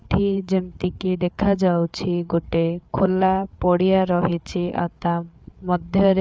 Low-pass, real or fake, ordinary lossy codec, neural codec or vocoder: none; fake; none; codec, 16 kHz, 8 kbps, FreqCodec, larger model